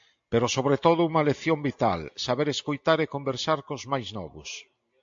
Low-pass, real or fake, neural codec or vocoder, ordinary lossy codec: 7.2 kHz; real; none; AAC, 64 kbps